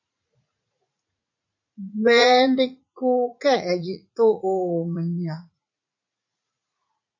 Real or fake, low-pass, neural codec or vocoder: fake; 7.2 kHz; vocoder, 44.1 kHz, 80 mel bands, Vocos